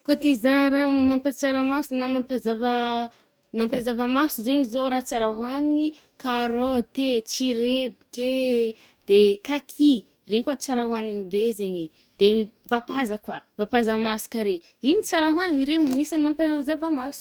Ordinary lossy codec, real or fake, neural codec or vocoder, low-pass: none; fake; codec, 44.1 kHz, 2.6 kbps, DAC; none